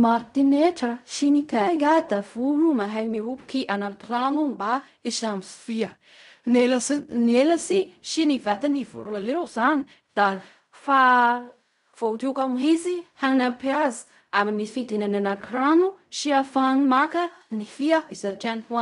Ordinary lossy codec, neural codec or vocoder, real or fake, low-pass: none; codec, 16 kHz in and 24 kHz out, 0.4 kbps, LongCat-Audio-Codec, fine tuned four codebook decoder; fake; 10.8 kHz